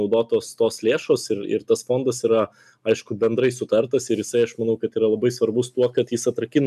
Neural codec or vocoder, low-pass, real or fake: none; 14.4 kHz; real